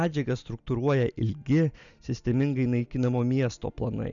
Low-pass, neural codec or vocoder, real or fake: 7.2 kHz; none; real